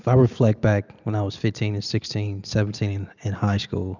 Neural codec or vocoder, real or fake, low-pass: none; real; 7.2 kHz